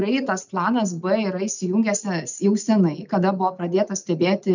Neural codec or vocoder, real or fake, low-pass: none; real; 7.2 kHz